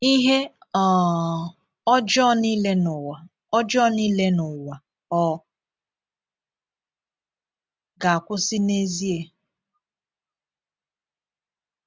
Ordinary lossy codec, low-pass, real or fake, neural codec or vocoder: none; none; real; none